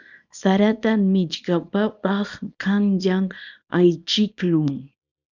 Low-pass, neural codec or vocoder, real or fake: 7.2 kHz; codec, 24 kHz, 0.9 kbps, WavTokenizer, small release; fake